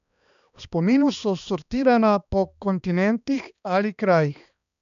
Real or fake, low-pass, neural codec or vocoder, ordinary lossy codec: fake; 7.2 kHz; codec, 16 kHz, 2 kbps, X-Codec, HuBERT features, trained on balanced general audio; none